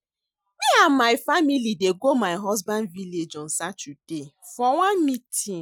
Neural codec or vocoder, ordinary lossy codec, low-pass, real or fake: none; none; 19.8 kHz; real